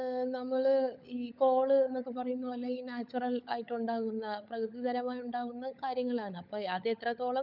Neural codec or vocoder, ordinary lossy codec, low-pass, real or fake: codec, 16 kHz, 16 kbps, FunCodec, trained on LibriTTS, 50 frames a second; none; 5.4 kHz; fake